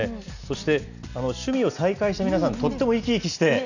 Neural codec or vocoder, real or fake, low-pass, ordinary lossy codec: none; real; 7.2 kHz; none